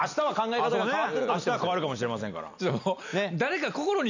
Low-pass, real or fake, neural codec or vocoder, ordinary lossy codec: 7.2 kHz; real; none; none